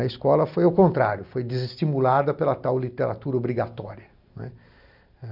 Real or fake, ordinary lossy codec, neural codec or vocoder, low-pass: real; none; none; 5.4 kHz